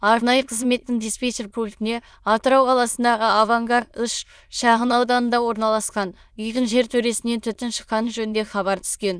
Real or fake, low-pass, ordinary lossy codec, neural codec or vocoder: fake; none; none; autoencoder, 22.05 kHz, a latent of 192 numbers a frame, VITS, trained on many speakers